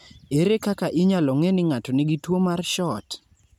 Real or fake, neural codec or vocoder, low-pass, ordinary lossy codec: real; none; 19.8 kHz; none